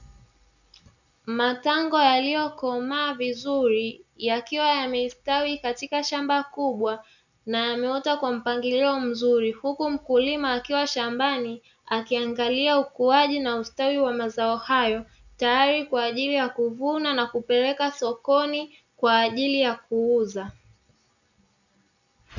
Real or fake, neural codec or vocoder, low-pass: real; none; 7.2 kHz